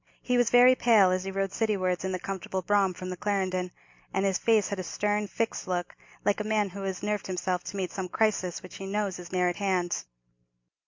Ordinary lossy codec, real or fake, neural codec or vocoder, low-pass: MP3, 48 kbps; real; none; 7.2 kHz